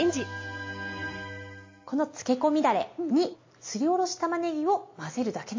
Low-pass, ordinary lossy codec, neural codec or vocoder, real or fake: 7.2 kHz; MP3, 32 kbps; none; real